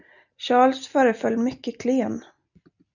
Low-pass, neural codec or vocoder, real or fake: 7.2 kHz; none; real